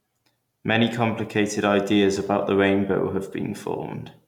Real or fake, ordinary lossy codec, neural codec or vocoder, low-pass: real; none; none; 19.8 kHz